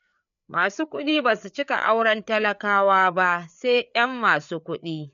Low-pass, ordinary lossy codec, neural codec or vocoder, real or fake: 7.2 kHz; none; codec, 16 kHz, 4 kbps, FreqCodec, larger model; fake